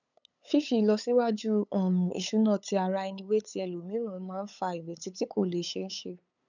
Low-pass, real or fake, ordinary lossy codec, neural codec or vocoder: 7.2 kHz; fake; none; codec, 16 kHz, 8 kbps, FunCodec, trained on LibriTTS, 25 frames a second